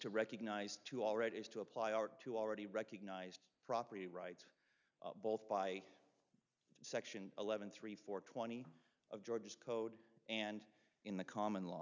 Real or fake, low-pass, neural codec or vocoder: real; 7.2 kHz; none